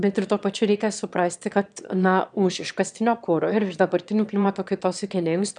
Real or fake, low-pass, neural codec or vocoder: fake; 9.9 kHz; autoencoder, 22.05 kHz, a latent of 192 numbers a frame, VITS, trained on one speaker